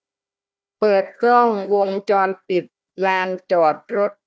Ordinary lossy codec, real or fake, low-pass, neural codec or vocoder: none; fake; none; codec, 16 kHz, 1 kbps, FunCodec, trained on Chinese and English, 50 frames a second